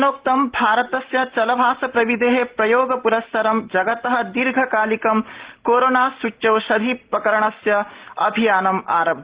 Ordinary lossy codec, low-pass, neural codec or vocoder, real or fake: Opus, 16 kbps; 3.6 kHz; none; real